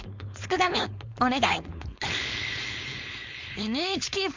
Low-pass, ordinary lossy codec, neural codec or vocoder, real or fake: 7.2 kHz; MP3, 64 kbps; codec, 16 kHz, 4.8 kbps, FACodec; fake